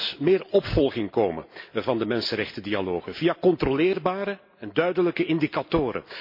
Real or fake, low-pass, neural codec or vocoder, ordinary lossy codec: real; 5.4 kHz; none; MP3, 32 kbps